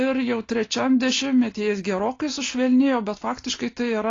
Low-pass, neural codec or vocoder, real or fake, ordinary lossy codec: 7.2 kHz; none; real; AAC, 32 kbps